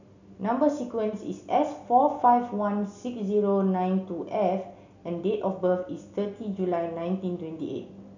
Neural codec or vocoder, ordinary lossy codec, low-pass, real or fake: none; none; 7.2 kHz; real